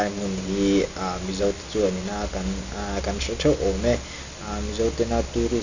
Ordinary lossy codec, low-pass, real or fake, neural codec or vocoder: none; 7.2 kHz; real; none